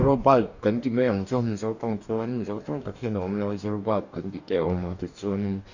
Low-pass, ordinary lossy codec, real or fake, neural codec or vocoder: 7.2 kHz; none; fake; codec, 44.1 kHz, 2.6 kbps, DAC